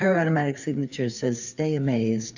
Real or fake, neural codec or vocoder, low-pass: fake; codec, 16 kHz, 4 kbps, FreqCodec, larger model; 7.2 kHz